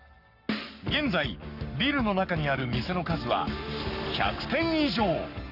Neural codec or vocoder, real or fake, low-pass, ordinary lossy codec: codec, 44.1 kHz, 7.8 kbps, Pupu-Codec; fake; 5.4 kHz; none